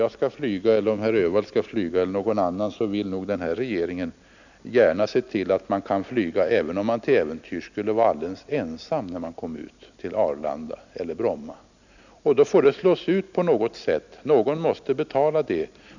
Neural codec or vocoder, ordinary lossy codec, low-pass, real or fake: none; none; 7.2 kHz; real